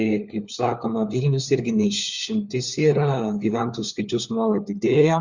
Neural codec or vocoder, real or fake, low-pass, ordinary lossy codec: codec, 16 kHz, 4 kbps, FunCodec, trained on LibriTTS, 50 frames a second; fake; 7.2 kHz; Opus, 64 kbps